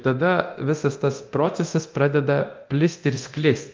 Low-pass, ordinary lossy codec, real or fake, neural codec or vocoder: 7.2 kHz; Opus, 32 kbps; fake; codec, 24 kHz, 0.9 kbps, DualCodec